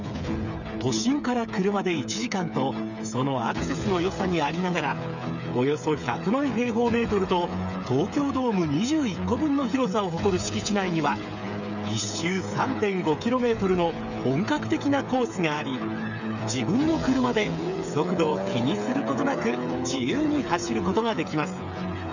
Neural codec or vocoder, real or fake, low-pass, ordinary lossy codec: codec, 16 kHz, 8 kbps, FreqCodec, smaller model; fake; 7.2 kHz; none